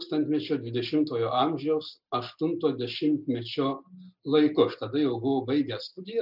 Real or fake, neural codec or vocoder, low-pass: real; none; 5.4 kHz